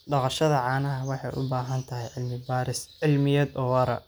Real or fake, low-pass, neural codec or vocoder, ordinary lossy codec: real; none; none; none